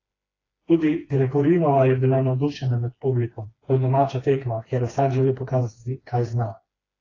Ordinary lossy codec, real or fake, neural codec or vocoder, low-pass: AAC, 32 kbps; fake; codec, 16 kHz, 2 kbps, FreqCodec, smaller model; 7.2 kHz